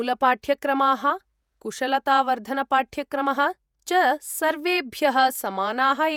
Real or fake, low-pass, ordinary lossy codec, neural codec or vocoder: fake; 19.8 kHz; none; vocoder, 48 kHz, 128 mel bands, Vocos